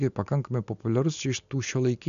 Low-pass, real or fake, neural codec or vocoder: 7.2 kHz; real; none